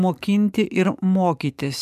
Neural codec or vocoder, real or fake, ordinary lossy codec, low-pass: codec, 44.1 kHz, 7.8 kbps, DAC; fake; MP3, 96 kbps; 14.4 kHz